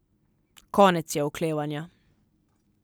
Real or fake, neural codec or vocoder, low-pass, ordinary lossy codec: real; none; none; none